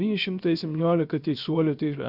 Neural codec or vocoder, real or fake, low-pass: codec, 16 kHz, 0.7 kbps, FocalCodec; fake; 5.4 kHz